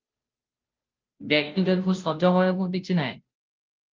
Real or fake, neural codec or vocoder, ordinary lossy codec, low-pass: fake; codec, 16 kHz, 0.5 kbps, FunCodec, trained on Chinese and English, 25 frames a second; Opus, 32 kbps; 7.2 kHz